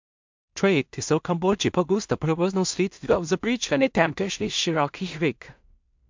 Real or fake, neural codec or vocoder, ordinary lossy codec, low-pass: fake; codec, 16 kHz in and 24 kHz out, 0.4 kbps, LongCat-Audio-Codec, two codebook decoder; MP3, 64 kbps; 7.2 kHz